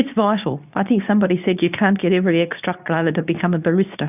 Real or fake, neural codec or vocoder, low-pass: fake; codec, 24 kHz, 0.9 kbps, WavTokenizer, medium speech release version 2; 3.6 kHz